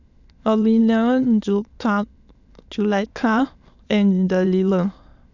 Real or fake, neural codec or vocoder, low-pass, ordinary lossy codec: fake; autoencoder, 22.05 kHz, a latent of 192 numbers a frame, VITS, trained on many speakers; 7.2 kHz; none